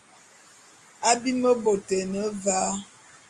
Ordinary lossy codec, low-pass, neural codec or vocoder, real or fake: Opus, 32 kbps; 10.8 kHz; none; real